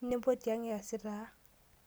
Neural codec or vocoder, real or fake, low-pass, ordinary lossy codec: none; real; none; none